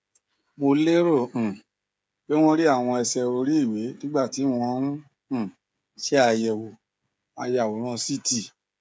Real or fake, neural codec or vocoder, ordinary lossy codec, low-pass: fake; codec, 16 kHz, 16 kbps, FreqCodec, smaller model; none; none